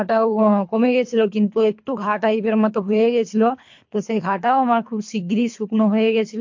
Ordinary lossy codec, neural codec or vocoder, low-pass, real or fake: MP3, 48 kbps; codec, 24 kHz, 3 kbps, HILCodec; 7.2 kHz; fake